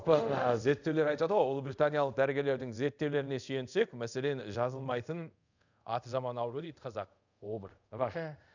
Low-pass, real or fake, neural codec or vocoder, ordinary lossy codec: 7.2 kHz; fake; codec, 24 kHz, 0.5 kbps, DualCodec; none